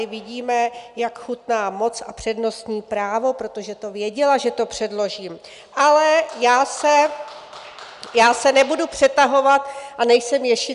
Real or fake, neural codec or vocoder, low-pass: real; none; 10.8 kHz